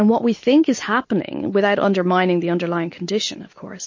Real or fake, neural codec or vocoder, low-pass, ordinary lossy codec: real; none; 7.2 kHz; MP3, 32 kbps